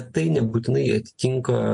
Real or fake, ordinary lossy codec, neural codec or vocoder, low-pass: real; MP3, 48 kbps; none; 9.9 kHz